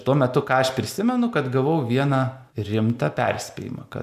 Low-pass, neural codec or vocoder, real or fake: 14.4 kHz; none; real